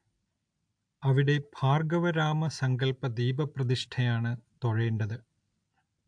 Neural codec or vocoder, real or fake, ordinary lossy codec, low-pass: none; real; AAC, 64 kbps; 9.9 kHz